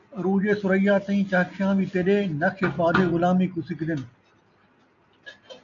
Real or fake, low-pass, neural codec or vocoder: real; 7.2 kHz; none